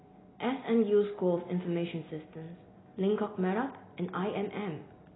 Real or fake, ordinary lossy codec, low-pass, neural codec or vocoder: real; AAC, 16 kbps; 7.2 kHz; none